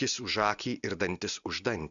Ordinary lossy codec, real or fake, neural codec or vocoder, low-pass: Opus, 64 kbps; real; none; 7.2 kHz